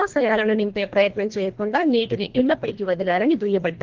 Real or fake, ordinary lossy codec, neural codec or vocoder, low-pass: fake; Opus, 16 kbps; codec, 24 kHz, 1.5 kbps, HILCodec; 7.2 kHz